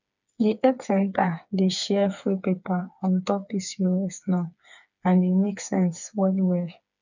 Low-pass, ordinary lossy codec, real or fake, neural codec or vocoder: 7.2 kHz; none; fake; codec, 16 kHz, 4 kbps, FreqCodec, smaller model